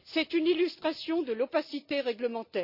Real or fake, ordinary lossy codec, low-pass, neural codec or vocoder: real; Opus, 64 kbps; 5.4 kHz; none